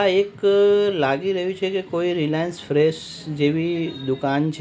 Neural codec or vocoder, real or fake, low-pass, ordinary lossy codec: none; real; none; none